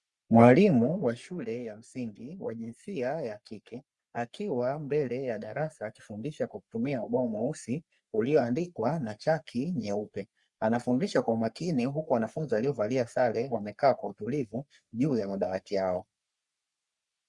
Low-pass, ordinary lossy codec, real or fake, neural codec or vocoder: 10.8 kHz; Opus, 64 kbps; fake; codec, 44.1 kHz, 3.4 kbps, Pupu-Codec